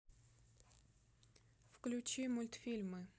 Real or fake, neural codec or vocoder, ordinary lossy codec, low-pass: real; none; none; none